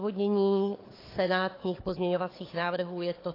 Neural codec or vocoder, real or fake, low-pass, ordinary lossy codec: codec, 16 kHz, 4 kbps, FunCodec, trained on Chinese and English, 50 frames a second; fake; 5.4 kHz; AAC, 24 kbps